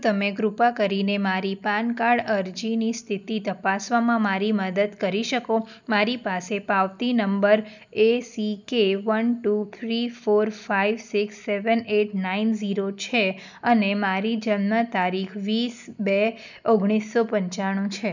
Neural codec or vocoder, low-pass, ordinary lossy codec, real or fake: none; 7.2 kHz; none; real